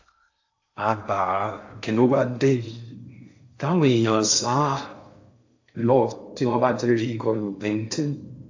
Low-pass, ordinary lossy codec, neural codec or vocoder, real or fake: 7.2 kHz; AAC, 48 kbps; codec, 16 kHz in and 24 kHz out, 0.6 kbps, FocalCodec, streaming, 4096 codes; fake